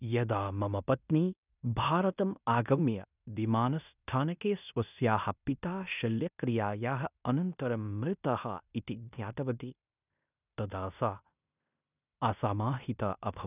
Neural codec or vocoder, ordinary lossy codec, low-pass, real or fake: codec, 16 kHz in and 24 kHz out, 0.9 kbps, LongCat-Audio-Codec, fine tuned four codebook decoder; none; 3.6 kHz; fake